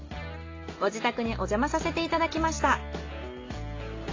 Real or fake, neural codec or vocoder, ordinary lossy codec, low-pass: real; none; AAC, 32 kbps; 7.2 kHz